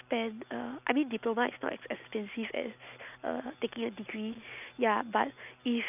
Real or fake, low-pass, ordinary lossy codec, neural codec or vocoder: real; 3.6 kHz; none; none